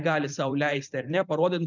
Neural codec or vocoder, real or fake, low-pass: vocoder, 44.1 kHz, 128 mel bands every 512 samples, BigVGAN v2; fake; 7.2 kHz